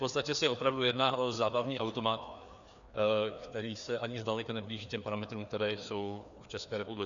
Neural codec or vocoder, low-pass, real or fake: codec, 16 kHz, 2 kbps, FreqCodec, larger model; 7.2 kHz; fake